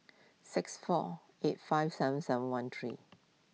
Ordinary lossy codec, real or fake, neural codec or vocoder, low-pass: none; real; none; none